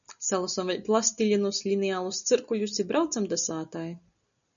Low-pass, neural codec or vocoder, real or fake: 7.2 kHz; none; real